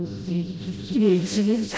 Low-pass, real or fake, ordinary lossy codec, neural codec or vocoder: none; fake; none; codec, 16 kHz, 0.5 kbps, FreqCodec, smaller model